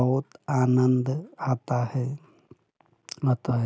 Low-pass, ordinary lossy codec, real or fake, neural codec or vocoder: none; none; real; none